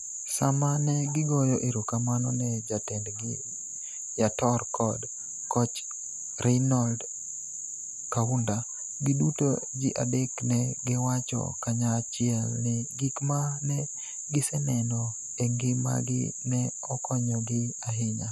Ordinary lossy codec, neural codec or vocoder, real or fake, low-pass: none; none; real; 14.4 kHz